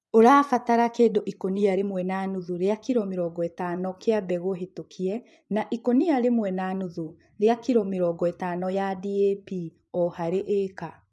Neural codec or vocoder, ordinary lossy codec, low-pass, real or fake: vocoder, 24 kHz, 100 mel bands, Vocos; none; none; fake